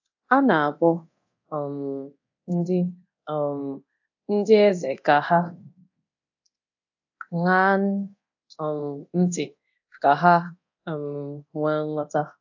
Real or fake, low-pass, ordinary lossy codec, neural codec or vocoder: fake; 7.2 kHz; AAC, 48 kbps; codec, 24 kHz, 0.9 kbps, DualCodec